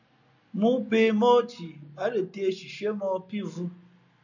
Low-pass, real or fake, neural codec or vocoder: 7.2 kHz; real; none